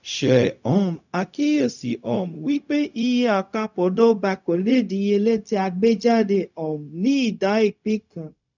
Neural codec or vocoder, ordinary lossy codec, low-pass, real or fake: codec, 16 kHz, 0.4 kbps, LongCat-Audio-Codec; none; 7.2 kHz; fake